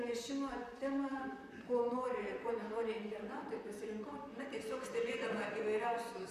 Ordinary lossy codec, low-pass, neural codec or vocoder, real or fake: MP3, 96 kbps; 14.4 kHz; vocoder, 44.1 kHz, 128 mel bands, Pupu-Vocoder; fake